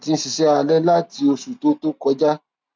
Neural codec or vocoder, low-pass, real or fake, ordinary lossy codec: none; none; real; none